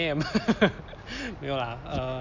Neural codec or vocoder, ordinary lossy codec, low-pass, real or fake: none; none; 7.2 kHz; real